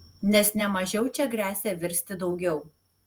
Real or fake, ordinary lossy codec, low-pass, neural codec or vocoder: fake; Opus, 32 kbps; 19.8 kHz; vocoder, 48 kHz, 128 mel bands, Vocos